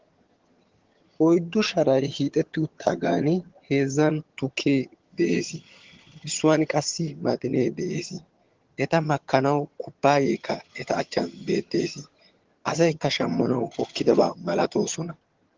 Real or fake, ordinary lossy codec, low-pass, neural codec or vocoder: fake; Opus, 16 kbps; 7.2 kHz; vocoder, 22.05 kHz, 80 mel bands, HiFi-GAN